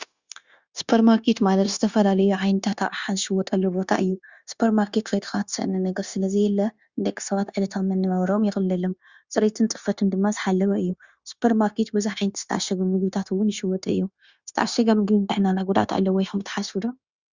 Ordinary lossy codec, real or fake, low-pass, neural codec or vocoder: Opus, 64 kbps; fake; 7.2 kHz; codec, 16 kHz, 0.9 kbps, LongCat-Audio-Codec